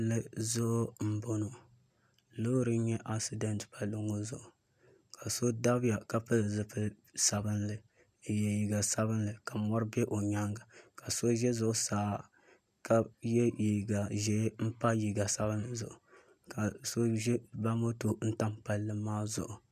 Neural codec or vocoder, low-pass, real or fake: none; 14.4 kHz; real